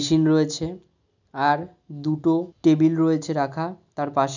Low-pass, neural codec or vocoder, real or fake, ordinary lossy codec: 7.2 kHz; none; real; none